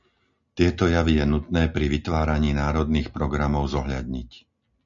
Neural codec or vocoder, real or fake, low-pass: none; real; 7.2 kHz